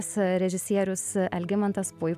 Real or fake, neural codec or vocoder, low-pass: real; none; 14.4 kHz